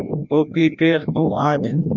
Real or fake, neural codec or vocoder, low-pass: fake; codec, 16 kHz, 1 kbps, FreqCodec, larger model; 7.2 kHz